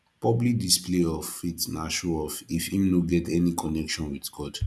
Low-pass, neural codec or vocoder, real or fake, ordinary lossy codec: none; none; real; none